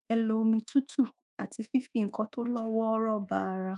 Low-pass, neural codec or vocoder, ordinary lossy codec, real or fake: 10.8 kHz; codec, 24 kHz, 1.2 kbps, DualCodec; none; fake